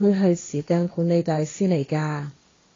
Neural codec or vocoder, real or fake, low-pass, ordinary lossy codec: codec, 16 kHz, 1.1 kbps, Voila-Tokenizer; fake; 7.2 kHz; AAC, 32 kbps